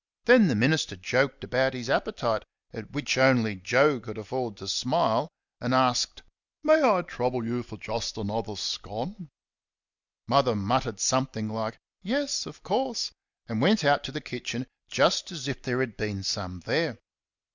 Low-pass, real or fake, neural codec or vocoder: 7.2 kHz; real; none